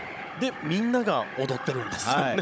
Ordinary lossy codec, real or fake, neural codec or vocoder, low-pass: none; fake; codec, 16 kHz, 16 kbps, FunCodec, trained on Chinese and English, 50 frames a second; none